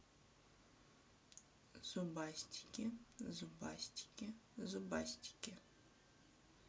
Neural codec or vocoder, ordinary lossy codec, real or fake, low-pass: none; none; real; none